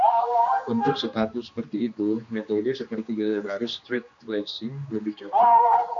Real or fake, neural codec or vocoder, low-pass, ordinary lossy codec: fake; codec, 16 kHz, 2 kbps, X-Codec, HuBERT features, trained on general audio; 7.2 kHz; AAC, 64 kbps